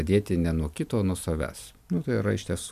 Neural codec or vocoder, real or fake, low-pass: none; real; 14.4 kHz